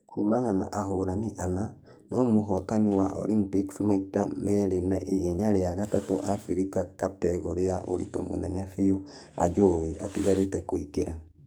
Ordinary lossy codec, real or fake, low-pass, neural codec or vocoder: none; fake; none; codec, 44.1 kHz, 2.6 kbps, SNAC